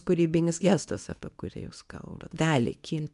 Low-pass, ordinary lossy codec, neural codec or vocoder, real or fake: 10.8 kHz; MP3, 96 kbps; codec, 24 kHz, 0.9 kbps, WavTokenizer, medium speech release version 2; fake